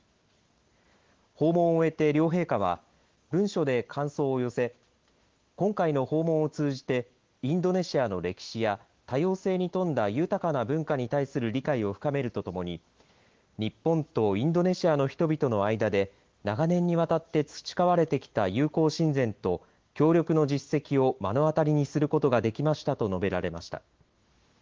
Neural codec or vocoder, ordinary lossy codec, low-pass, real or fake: autoencoder, 48 kHz, 128 numbers a frame, DAC-VAE, trained on Japanese speech; Opus, 16 kbps; 7.2 kHz; fake